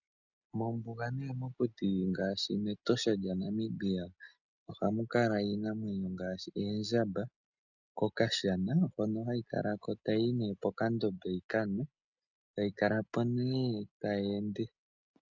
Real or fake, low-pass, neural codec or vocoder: real; 7.2 kHz; none